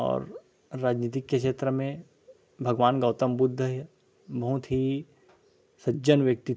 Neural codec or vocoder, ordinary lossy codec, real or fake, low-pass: none; none; real; none